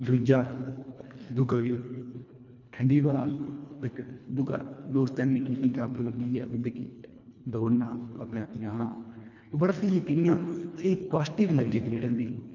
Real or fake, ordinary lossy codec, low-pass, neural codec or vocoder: fake; none; 7.2 kHz; codec, 24 kHz, 1.5 kbps, HILCodec